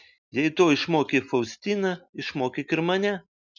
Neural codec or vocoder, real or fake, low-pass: none; real; 7.2 kHz